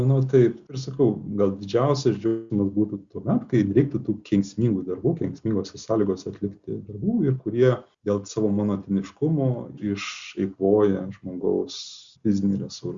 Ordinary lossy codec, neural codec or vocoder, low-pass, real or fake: Opus, 64 kbps; none; 7.2 kHz; real